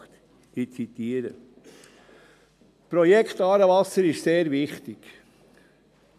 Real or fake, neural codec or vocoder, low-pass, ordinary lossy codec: real; none; 14.4 kHz; AAC, 96 kbps